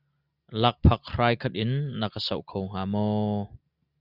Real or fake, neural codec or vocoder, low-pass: real; none; 5.4 kHz